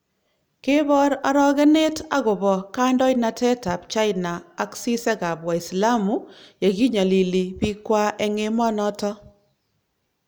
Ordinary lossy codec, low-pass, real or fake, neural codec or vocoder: none; none; real; none